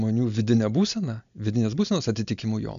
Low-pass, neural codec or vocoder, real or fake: 7.2 kHz; none; real